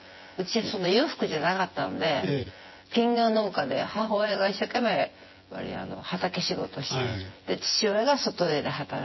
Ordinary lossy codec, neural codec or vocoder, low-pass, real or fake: MP3, 24 kbps; vocoder, 24 kHz, 100 mel bands, Vocos; 7.2 kHz; fake